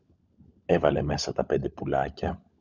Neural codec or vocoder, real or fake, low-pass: codec, 16 kHz, 16 kbps, FunCodec, trained on LibriTTS, 50 frames a second; fake; 7.2 kHz